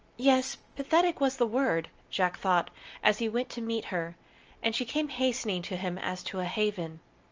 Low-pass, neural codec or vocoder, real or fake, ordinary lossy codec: 7.2 kHz; none; real; Opus, 24 kbps